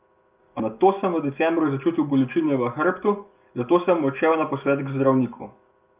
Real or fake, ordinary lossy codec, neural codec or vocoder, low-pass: real; Opus, 24 kbps; none; 3.6 kHz